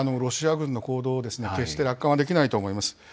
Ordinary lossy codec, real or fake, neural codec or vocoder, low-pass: none; real; none; none